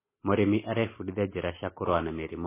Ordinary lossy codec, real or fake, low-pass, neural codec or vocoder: MP3, 16 kbps; real; 3.6 kHz; none